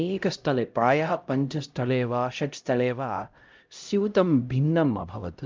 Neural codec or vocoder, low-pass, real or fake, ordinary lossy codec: codec, 16 kHz, 0.5 kbps, X-Codec, HuBERT features, trained on LibriSpeech; 7.2 kHz; fake; Opus, 24 kbps